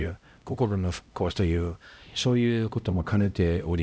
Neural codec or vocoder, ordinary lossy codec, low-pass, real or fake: codec, 16 kHz, 0.5 kbps, X-Codec, HuBERT features, trained on LibriSpeech; none; none; fake